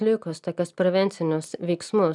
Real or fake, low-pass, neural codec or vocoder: real; 10.8 kHz; none